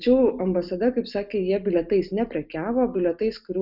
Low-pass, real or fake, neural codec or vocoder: 5.4 kHz; real; none